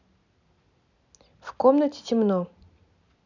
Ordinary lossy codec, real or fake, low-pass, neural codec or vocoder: none; real; 7.2 kHz; none